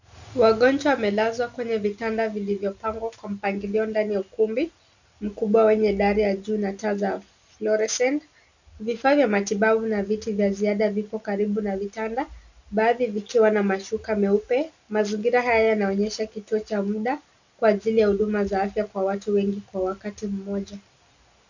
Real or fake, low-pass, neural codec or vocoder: real; 7.2 kHz; none